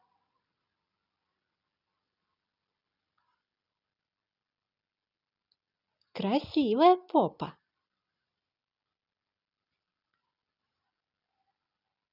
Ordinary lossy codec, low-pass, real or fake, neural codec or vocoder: none; 5.4 kHz; real; none